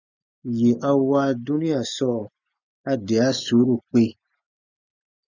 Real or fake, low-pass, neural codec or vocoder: real; 7.2 kHz; none